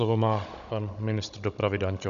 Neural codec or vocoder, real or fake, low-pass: codec, 16 kHz, 16 kbps, FunCodec, trained on Chinese and English, 50 frames a second; fake; 7.2 kHz